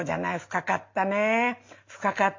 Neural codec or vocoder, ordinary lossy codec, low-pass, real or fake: none; MP3, 48 kbps; 7.2 kHz; real